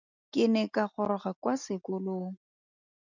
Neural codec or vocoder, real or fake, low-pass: none; real; 7.2 kHz